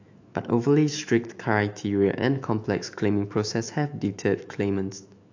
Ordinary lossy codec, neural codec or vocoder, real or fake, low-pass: AAC, 48 kbps; autoencoder, 48 kHz, 128 numbers a frame, DAC-VAE, trained on Japanese speech; fake; 7.2 kHz